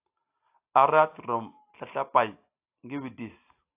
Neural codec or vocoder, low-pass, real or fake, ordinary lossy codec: none; 3.6 kHz; real; AAC, 24 kbps